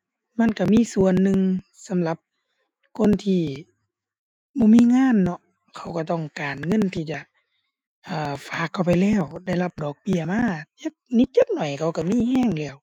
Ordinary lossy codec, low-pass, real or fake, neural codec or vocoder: none; 19.8 kHz; fake; autoencoder, 48 kHz, 128 numbers a frame, DAC-VAE, trained on Japanese speech